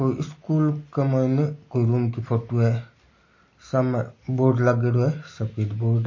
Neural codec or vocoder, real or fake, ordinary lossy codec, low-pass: none; real; MP3, 32 kbps; 7.2 kHz